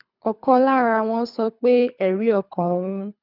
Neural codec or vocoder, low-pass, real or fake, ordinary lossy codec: codec, 24 kHz, 3 kbps, HILCodec; 5.4 kHz; fake; none